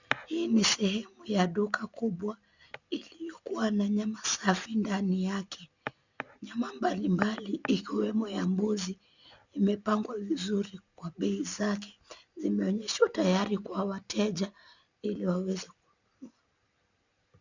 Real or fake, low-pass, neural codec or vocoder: real; 7.2 kHz; none